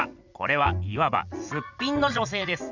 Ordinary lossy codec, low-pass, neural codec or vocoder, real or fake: none; 7.2 kHz; vocoder, 44.1 kHz, 80 mel bands, Vocos; fake